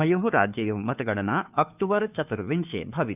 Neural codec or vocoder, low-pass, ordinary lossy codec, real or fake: codec, 16 kHz, 2 kbps, FunCodec, trained on Chinese and English, 25 frames a second; 3.6 kHz; none; fake